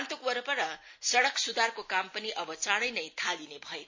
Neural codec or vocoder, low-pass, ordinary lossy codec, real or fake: none; 7.2 kHz; MP3, 32 kbps; real